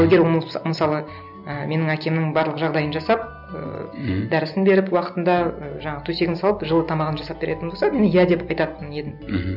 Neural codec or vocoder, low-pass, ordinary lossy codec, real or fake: none; 5.4 kHz; none; real